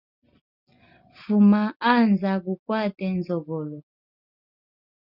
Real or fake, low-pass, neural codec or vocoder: real; 5.4 kHz; none